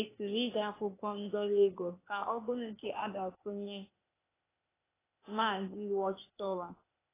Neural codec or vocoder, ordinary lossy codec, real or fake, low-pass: codec, 16 kHz, 0.8 kbps, ZipCodec; AAC, 16 kbps; fake; 3.6 kHz